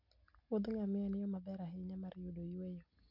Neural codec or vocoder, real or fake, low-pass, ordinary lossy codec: none; real; 5.4 kHz; none